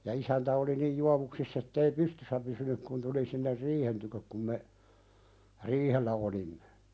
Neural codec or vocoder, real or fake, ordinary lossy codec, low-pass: none; real; none; none